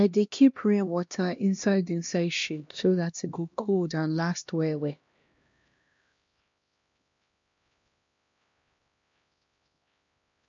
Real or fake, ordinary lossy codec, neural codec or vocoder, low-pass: fake; MP3, 48 kbps; codec, 16 kHz, 1 kbps, X-Codec, HuBERT features, trained on LibriSpeech; 7.2 kHz